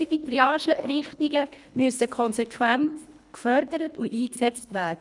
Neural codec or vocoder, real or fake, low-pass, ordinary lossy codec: codec, 24 kHz, 1.5 kbps, HILCodec; fake; 10.8 kHz; none